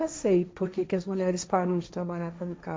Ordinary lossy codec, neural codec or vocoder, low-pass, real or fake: none; codec, 16 kHz, 1.1 kbps, Voila-Tokenizer; none; fake